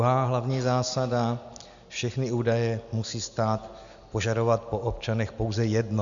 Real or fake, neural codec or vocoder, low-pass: real; none; 7.2 kHz